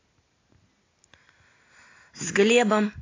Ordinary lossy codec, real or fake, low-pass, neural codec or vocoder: AAC, 32 kbps; real; 7.2 kHz; none